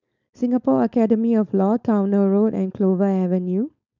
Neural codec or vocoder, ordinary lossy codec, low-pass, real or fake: codec, 16 kHz, 4.8 kbps, FACodec; none; 7.2 kHz; fake